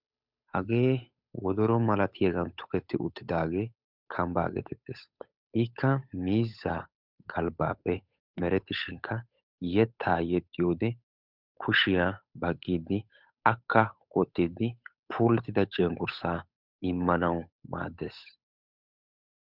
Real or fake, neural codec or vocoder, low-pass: fake; codec, 16 kHz, 8 kbps, FunCodec, trained on Chinese and English, 25 frames a second; 5.4 kHz